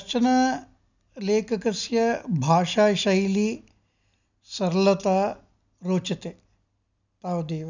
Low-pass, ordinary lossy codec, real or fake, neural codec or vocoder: 7.2 kHz; none; real; none